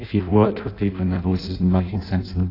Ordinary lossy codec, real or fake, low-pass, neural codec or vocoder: AAC, 32 kbps; fake; 5.4 kHz; codec, 16 kHz in and 24 kHz out, 0.6 kbps, FireRedTTS-2 codec